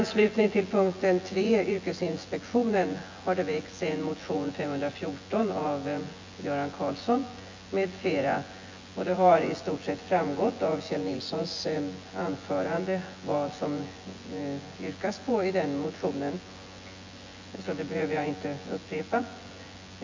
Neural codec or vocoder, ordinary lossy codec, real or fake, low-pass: vocoder, 24 kHz, 100 mel bands, Vocos; MP3, 48 kbps; fake; 7.2 kHz